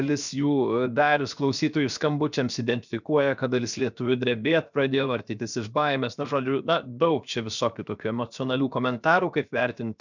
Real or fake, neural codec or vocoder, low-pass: fake; codec, 16 kHz, 0.7 kbps, FocalCodec; 7.2 kHz